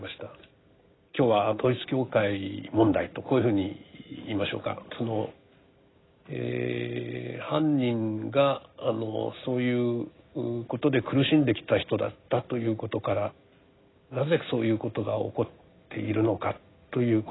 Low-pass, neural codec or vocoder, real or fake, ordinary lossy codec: 7.2 kHz; none; real; AAC, 16 kbps